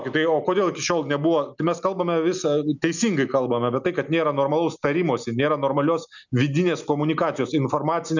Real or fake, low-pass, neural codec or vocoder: real; 7.2 kHz; none